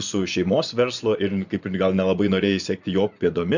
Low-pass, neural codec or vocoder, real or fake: 7.2 kHz; vocoder, 24 kHz, 100 mel bands, Vocos; fake